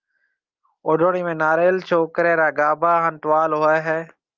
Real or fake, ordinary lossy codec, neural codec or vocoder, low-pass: real; Opus, 32 kbps; none; 7.2 kHz